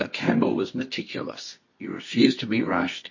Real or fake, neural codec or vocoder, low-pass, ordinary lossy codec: fake; codec, 24 kHz, 0.9 kbps, WavTokenizer, medium music audio release; 7.2 kHz; MP3, 32 kbps